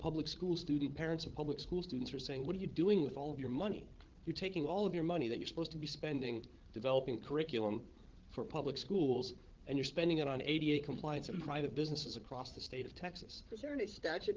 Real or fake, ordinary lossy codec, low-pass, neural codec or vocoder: fake; Opus, 16 kbps; 7.2 kHz; codec, 16 kHz, 8 kbps, FreqCodec, larger model